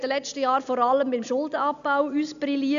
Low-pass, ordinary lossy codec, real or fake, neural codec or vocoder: 7.2 kHz; AAC, 96 kbps; real; none